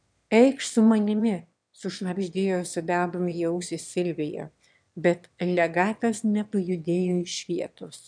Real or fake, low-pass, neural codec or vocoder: fake; 9.9 kHz; autoencoder, 22.05 kHz, a latent of 192 numbers a frame, VITS, trained on one speaker